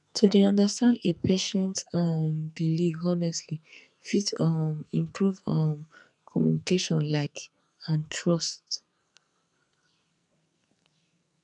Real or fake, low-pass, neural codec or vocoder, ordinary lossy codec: fake; 10.8 kHz; codec, 44.1 kHz, 2.6 kbps, SNAC; none